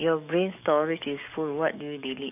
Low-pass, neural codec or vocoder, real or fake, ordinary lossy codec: 3.6 kHz; codec, 44.1 kHz, 7.8 kbps, DAC; fake; none